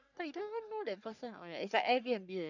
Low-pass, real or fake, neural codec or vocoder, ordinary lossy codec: 7.2 kHz; fake; codec, 44.1 kHz, 3.4 kbps, Pupu-Codec; none